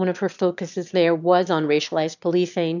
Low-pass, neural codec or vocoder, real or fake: 7.2 kHz; autoencoder, 22.05 kHz, a latent of 192 numbers a frame, VITS, trained on one speaker; fake